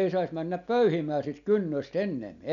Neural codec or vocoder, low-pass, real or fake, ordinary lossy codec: none; 7.2 kHz; real; none